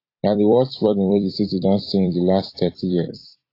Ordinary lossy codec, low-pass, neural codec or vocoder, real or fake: AAC, 32 kbps; 5.4 kHz; vocoder, 44.1 kHz, 128 mel bands every 256 samples, BigVGAN v2; fake